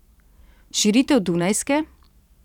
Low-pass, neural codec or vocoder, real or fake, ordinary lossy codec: 19.8 kHz; vocoder, 44.1 kHz, 128 mel bands every 512 samples, BigVGAN v2; fake; none